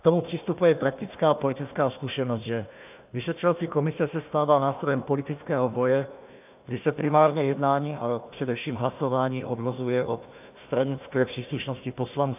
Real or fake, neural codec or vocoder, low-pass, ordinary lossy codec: fake; codec, 16 kHz, 1 kbps, FunCodec, trained on Chinese and English, 50 frames a second; 3.6 kHz; AAC, 32 kbps